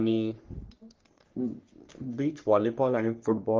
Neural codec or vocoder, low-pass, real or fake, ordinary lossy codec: codec, 44.1 kHz, 3.4 kbps, Pupu-Codec; 7.2 kHz; fake; Opus, 32 kbps